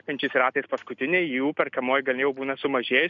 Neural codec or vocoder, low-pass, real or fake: none; 7.2 kHz; real